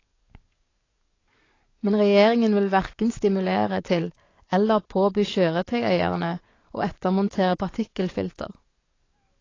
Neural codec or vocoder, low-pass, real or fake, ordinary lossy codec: codec, 44.1 kHz, 7.8 kbps, DAC; 7.2 kHz; fake; AAC, 32 kbps